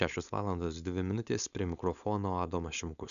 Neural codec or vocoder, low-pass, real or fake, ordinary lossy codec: codec, 16 kHz, 4.8 kbps, FACodec; 7.2 kHz; fake; MP3, 96 kbps